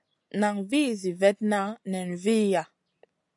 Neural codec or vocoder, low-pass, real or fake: none; 10.8 kHz; real